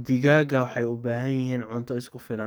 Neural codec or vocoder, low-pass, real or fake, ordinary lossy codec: codec, 44.1 kHz, 2.6 kbps, SNAC; none; fake; none